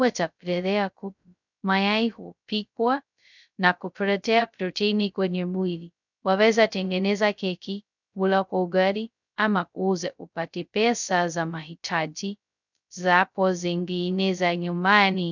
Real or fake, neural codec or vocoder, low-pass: fake; codec, 16 kHz, 0.2 kbps, FocalCodec; 7.2 kHz